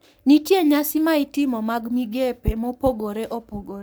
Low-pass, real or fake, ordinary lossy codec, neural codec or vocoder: none; fake; none; codec, 44.1 kHz, 7.8 kbps, Pupu-Codec